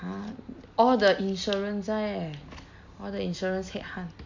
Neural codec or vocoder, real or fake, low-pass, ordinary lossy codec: none; real; 7.2 kHz; MP3, 64 kbps